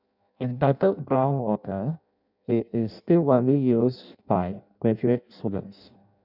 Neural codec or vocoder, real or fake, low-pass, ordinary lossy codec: codec, 16 kHz in and 24 kHz out, 0.6 kbps, FireRedTTS-2 codec; fake; 5.4 kHz; none